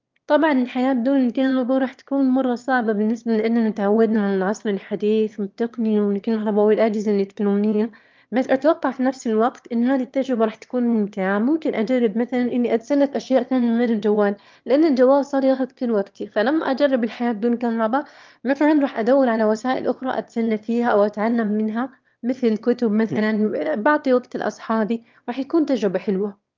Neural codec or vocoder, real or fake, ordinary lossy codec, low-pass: autoencoder, 22.05 kHz, a latent of 192 numbers a frame, VITS, trained on one speaker; fake; Opus, 24 kbps; 7.2 kHz